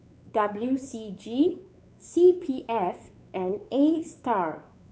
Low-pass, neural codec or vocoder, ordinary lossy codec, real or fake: none; codec, 16 kHz, 4 kbps, X-Codec, WavLM features, trained on Multilingual LibriSpeech; none; fake